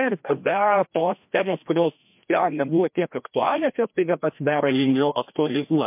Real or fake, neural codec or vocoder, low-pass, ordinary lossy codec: fake; codec, 16 kHz, 1 kbps, FreqCodec, larger model; 3.6 kHz; MP3, 32 kbps